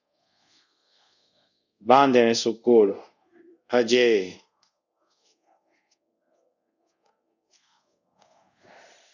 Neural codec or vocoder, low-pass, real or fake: codec, 24 kHz, 0.5 kbps, DualCodec; 7.2 kHz; fake